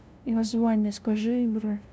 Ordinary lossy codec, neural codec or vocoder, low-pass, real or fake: none; codec, 16 kHz, 0.5 kbps, FunCodec, trained on LibriTTS, 25 frames a second; none; fake